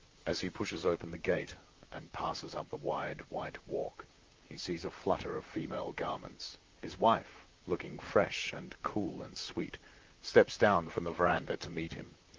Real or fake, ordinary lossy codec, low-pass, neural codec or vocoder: fake; Opus, 32 kbps; 7.2 kHz; vocoder, 44.1 kHz, 128 mel bands, Pupu-Vocoder